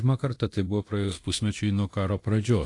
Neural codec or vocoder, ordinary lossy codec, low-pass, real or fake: codec, 24 kHz, 0.9 kbps, DualCodec; AAC, 48 kbps; 10.8 kHz; fake